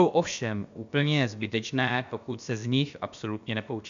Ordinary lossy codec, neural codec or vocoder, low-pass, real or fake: MP3, 64 kbps; codec, 16 kHz, about 1 kbps, DyCAST, with the encoder's durations; 7.2 kHz; fake